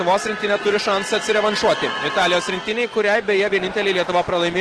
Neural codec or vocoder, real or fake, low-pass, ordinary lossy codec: none; real; 10.8 kHz; Opus, 16 kbps